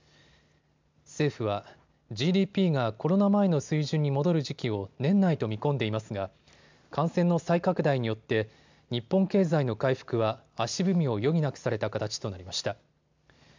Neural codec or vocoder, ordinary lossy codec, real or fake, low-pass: none; MP3, 64 kbps; real; 7.2 kHz